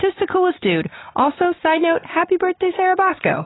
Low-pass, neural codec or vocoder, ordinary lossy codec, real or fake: 7.2 kHz; none; AAC, 16 kbps; real